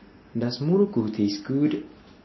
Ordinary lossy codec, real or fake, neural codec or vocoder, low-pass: MP3, 24 kbps; real; none; 7.2 kHz